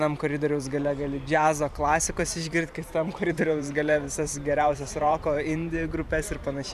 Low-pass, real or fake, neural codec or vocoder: 14.4 kHz; fake; vocoder, 44.1 kHz, 128 mel bands every 512 samples, BigVGAN v2